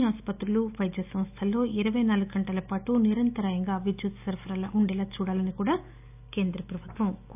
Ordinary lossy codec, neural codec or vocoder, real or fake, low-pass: none; none; real; 3.6 kHz